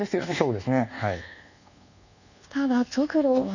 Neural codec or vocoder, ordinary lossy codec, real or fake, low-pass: codec, 24 kHz, 1.2 kbps, DualCodec; none; fake; 7.2 kHz